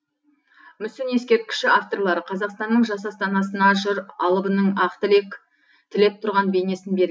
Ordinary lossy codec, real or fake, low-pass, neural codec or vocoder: none; real; none; none